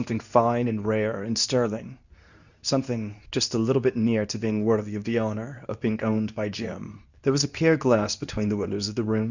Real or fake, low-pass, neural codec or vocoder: fake; 7.2 kHz; codec, 24 kHz, 0.9 kbps, WavTokenizer, medium speech release version 2